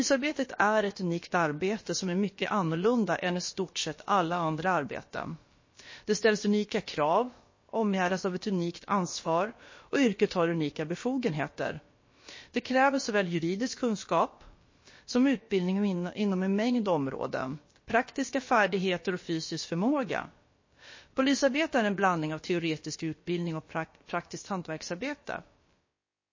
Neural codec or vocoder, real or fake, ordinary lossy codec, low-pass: codec, 16 kHz, about 1 kbps, DyCAST, with the encoder's durations; fake; MP3, 32 kbps; 7.2 kHz